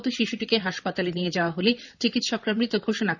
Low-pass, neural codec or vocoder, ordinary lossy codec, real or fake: 7.2 kHz; vocoder, 44.1 kHz, 128 mel bands, Pupu-Vocoder; none; fake